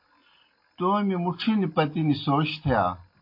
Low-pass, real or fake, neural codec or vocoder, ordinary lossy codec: 5.4 kHz; real; none; MP3, 32 kbps